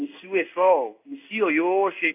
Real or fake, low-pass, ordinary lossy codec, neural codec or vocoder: fake; 3.6 kHz; AAC, 32 kbps; codec, 16 kHz in and 24 kHz out, 1 kbps, XY-Tokenizer